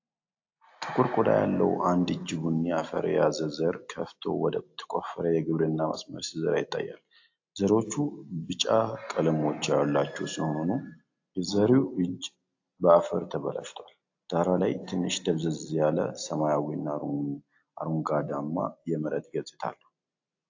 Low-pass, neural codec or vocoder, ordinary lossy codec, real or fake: 7.2 kHz; none; AAC, 48 kbps; real